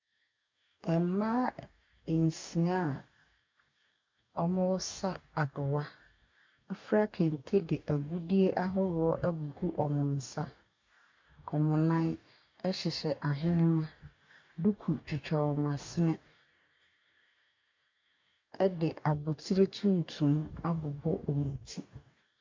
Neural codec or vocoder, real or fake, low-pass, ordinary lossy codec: codec, 44.1 kHz, 2.6 kbps, DAC; fake; 7.2 kHz; MP3, 64 kbps